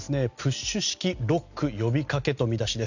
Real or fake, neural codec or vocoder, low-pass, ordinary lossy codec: real; none; 7.2 kHz; none